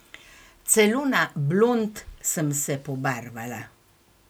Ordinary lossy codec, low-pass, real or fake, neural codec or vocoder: none; none; real; none